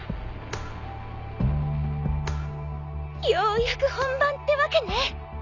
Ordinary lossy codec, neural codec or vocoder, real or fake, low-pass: none; none; real; 7.2 kHz